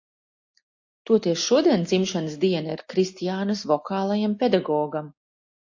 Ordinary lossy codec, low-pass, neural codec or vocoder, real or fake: AAC, 48 kbps; 7.2 kHz; none; real